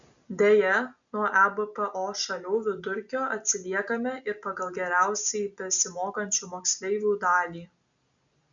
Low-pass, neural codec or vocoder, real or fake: 7.2 kHz; none; real